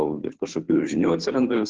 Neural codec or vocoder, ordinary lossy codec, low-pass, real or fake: codec, 16 kHz, 2 kbps, FunCodec, trained on Chinese and English, 25 frames a second; Opus, 16 kbps; 7.2 kHz; fake